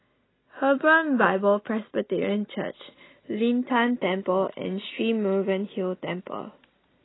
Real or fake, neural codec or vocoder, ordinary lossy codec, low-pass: real; none; AAC, 16 kbps; 7.2 kHz